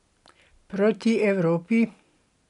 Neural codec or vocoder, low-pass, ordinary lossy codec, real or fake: none; 10.8 kHz; none; real